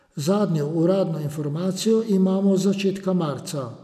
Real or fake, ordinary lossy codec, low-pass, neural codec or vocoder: real; none; 14.4 kHz; none